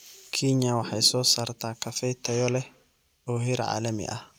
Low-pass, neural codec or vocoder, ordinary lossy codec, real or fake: none; none; none; real